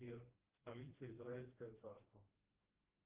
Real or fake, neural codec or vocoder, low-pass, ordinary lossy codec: fake; codec, 16 kHz, 1 kbps, FreqCodec, smaller model; 3.6 kHz; Opus, 16 kbps